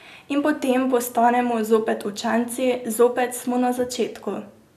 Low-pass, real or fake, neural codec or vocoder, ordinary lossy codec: 14.4 kHz; real; none; none